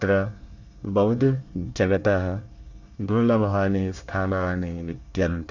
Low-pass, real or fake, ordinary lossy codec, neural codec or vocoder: 7.2 kHz; fake; none; codec, 24 kHz, 1 kbps, SNAC